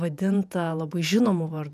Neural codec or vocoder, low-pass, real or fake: vocoder, 48 kHz, 128 mel bands, Vocos; 14.4 kHz; fake